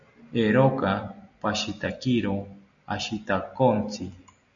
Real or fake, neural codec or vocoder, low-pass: real; none; 7.2 kHz